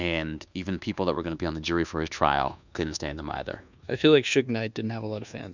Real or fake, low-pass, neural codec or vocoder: fake; 7.2 kHz; codec, 24 kHz, 1.2 kbps, DualCodec